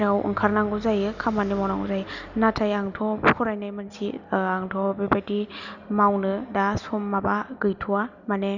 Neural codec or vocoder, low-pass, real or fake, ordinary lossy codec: none; 7.2 kHz; real; none